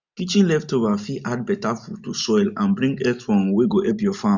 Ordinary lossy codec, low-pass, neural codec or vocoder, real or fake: none; 7.2 kHz; none; real